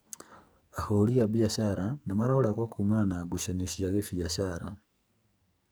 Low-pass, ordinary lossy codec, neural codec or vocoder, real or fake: none; none; codec, 44.1 kHz, 2.6 kbps, SNAC; fake